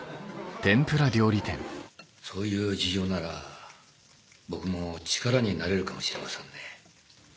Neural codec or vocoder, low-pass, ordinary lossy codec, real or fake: none; none; none; real